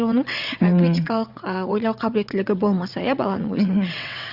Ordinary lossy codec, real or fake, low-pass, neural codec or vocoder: Opus, 64 kbps; fake; 5.4 kHz; vocoder, 22.05 kHz, 80 mel bands, WaveNeXt